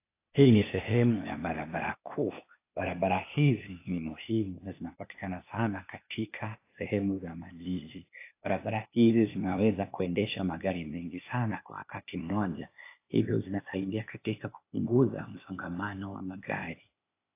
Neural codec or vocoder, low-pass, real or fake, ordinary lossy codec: codec, 16 kHz, 0.8 kbps, ZipCodec; 3.6 kHz; fake; AAC, 24 kbps